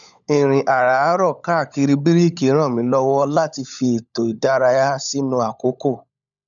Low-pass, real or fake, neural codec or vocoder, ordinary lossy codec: 7.2 kHz; fake; codec, 16 kHz, 16 kbps, FunCodec, trained on LibriTTS, 50 frames a second; none